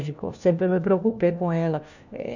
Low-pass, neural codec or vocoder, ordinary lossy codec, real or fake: 7.2 kHz; codec, 16 kHz, 1 kbps, FunCodec, trained on LibriTTS, 50 frames a second; none; fake